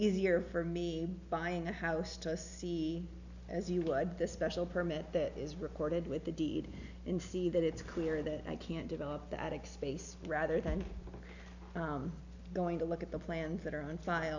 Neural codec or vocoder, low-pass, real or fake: none; 7.2 kHz; real